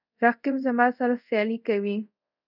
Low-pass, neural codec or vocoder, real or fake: 5.4 kHz; codec, 24 kHz, 0.5 kbps, DualCodec; fake